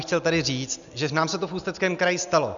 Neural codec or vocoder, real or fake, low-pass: none; real; 7.2 kHz